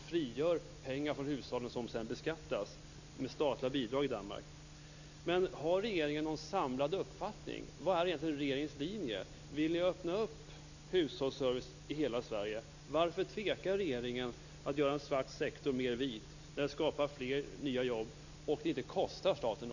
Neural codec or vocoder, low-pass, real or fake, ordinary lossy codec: none; 7.2 kHz; real; none